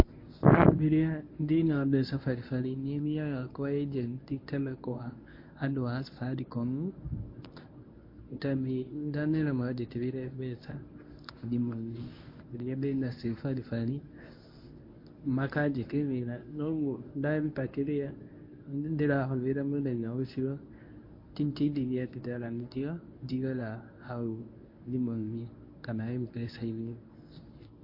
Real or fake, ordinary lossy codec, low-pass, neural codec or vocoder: fake; MP3, 32 kbps; 5.4 kHz; codec, 24 kHz, 0.9 kbps, WavTokenizer, medium speech release version 1